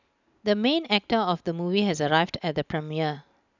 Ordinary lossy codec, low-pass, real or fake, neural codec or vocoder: none; 7.2 kHz; real; none